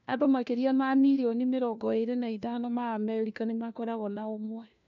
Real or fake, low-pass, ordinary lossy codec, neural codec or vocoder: fake; 7.2 kHz; none; codec, 16 kHz, 1 kbps, FunCodec, trained on LibriTTS, 50 frames a second